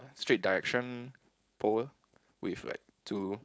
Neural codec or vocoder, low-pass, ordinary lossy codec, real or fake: codec, 16 kHz, 4.8 kbps, FACodec; none; none; fake